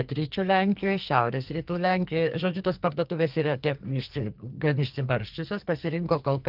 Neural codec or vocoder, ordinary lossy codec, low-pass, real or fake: codec, 44.1 kHz, 2.6 kbps, SNAC; Opus, 32 kbps; 5.4 kHz; fake